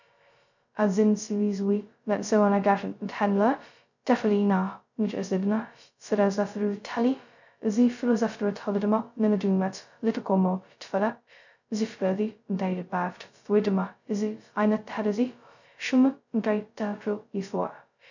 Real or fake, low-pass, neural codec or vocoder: fake; 7.2 kHz; codec, 16 kHz, 0.2 kbps, FocalCodec